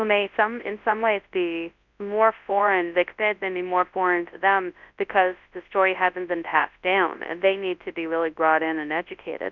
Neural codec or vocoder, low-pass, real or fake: codec, 24 kHz, 0.9 kbps, WavTokenizer, large speech release; 7.2 kHz; fake